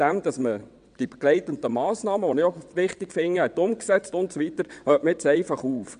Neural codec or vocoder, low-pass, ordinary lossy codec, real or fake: vocoder, 22.05 kHz, 80 mel bands, WaveNeXt; 9.9 kHz; none; fake